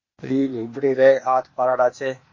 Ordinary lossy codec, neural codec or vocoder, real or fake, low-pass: MP3, 32 kbps; codec, 16 kHz, 0.8 kbps, ZipCodec; fake; 7.2 kHz